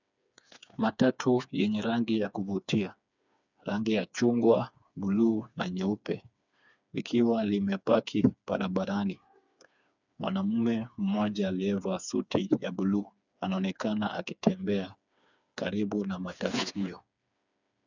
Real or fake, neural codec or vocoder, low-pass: fake; codec, 16 kHz, 4 kbps, FreqCodec, smaller model; 7.2 kHz